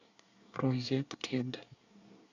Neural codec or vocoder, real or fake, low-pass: codec, 24 kHz, 1 kbps, SNAC; fake; 7.2 kHz